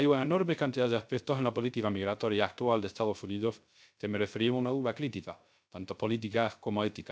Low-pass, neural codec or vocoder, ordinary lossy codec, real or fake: none; codec, 16 kHz, 0.3 kbps, FocalCodec; none; fake